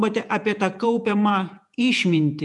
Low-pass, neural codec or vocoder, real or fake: 10.8 kHz; vocoder, 48 kHz, 128 mel bands, Vocos; fake